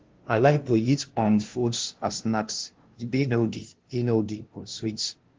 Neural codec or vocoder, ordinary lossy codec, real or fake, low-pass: codec, 16 kHz, 0.5 kbps, FunCodec, trained on LibriTTS, 25 frames a second; Opus, 16 kbps; fake; 7.2 kHz